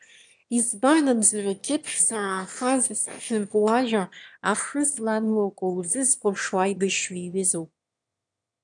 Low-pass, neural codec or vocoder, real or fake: 9.9 kHz; autoencoder, 22.05 kHz, a latent of 192 numbers a frame, VITS, trained on one speaker; fake